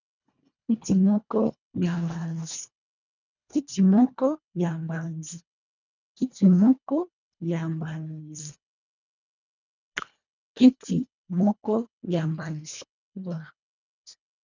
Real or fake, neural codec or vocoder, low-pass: fake; codec, 24 kHz, 1.5 kbps, HILCodec; 7.2 kHz